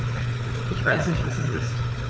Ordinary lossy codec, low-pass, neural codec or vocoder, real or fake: none; none; codec, 16 kHz, 4 kbps, FunCodec, trained on Chinese and English, 50 frames a second; fake